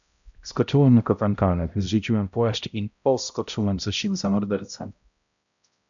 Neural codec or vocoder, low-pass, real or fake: codec, 16 kHz, 0.5 kbps, X-Codec, HuBERT features, trained on balanced general audio; 7.2 kHz; fake